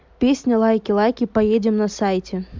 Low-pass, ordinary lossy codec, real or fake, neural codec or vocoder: 7.2 kHz; MP3, 64 kbps; real; none